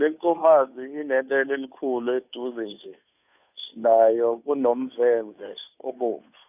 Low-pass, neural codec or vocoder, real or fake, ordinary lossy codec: 3.6 kHz; codec, 16 kHz, 2 kbps, FunCodec, trained on Chinese and English, 25 frames a second; fake; none